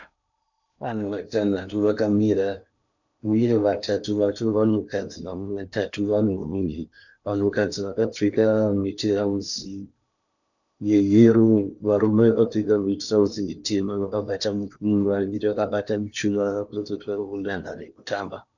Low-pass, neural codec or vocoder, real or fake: 7.2 kHz; codec, 16 kHz in and 24 kHz out, 0.8 kbps, FocalCodec, streaming, 65536 codes; fake